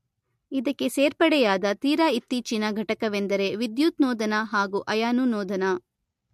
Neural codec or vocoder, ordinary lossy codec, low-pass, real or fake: none; MP3, 64 kbps; 14.4 kHz; real